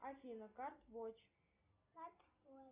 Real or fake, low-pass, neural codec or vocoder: real; 3.6 kHz; none